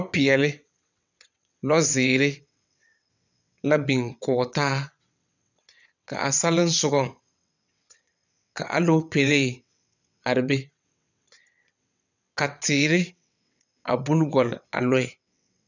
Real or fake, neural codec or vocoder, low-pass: fake; vocoder, 22.05 kHz, 80 mel bands, WaveNeXt; 7.2 kHz